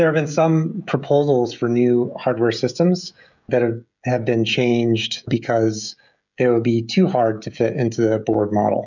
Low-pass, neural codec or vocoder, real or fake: 7.2 kHz; codec, 16 kHz, 16 kbps, FreqCodec, smaller model; fake